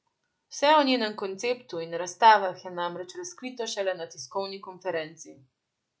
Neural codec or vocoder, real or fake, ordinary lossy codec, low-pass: none; real; none; none